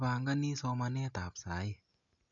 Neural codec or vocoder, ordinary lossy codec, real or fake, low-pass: none; none; real; 7.2 kHz